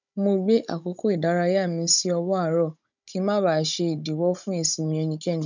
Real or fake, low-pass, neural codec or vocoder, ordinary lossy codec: fake; 7.2 kHz; codec, 16 kHz, 16 kbps, FunCodec, trained on Chinese and English, 50 frames a second; none